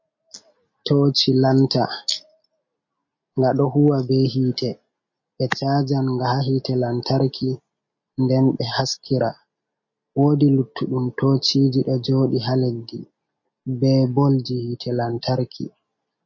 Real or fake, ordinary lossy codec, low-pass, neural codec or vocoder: real; MP3, 32 kbps; 7.2 kHz; none